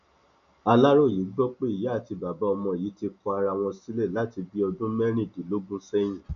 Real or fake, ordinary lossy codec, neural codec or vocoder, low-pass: real; none; none; 7.2 kHz